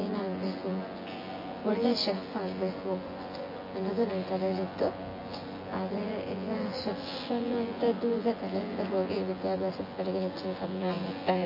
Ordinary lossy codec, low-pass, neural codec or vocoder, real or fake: MP3, 24 kbps; 5.4 kHz; vocoder, 24 kHz, 100 mel bands, Vocos; fake